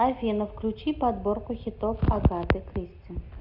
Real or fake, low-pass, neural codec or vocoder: real; 5.4 kHz; none